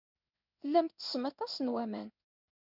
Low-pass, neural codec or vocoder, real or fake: 5.4 kHz; codec, 16 kHz in and 24 kHz out, 1 kbps, XY-Tokenizer; fake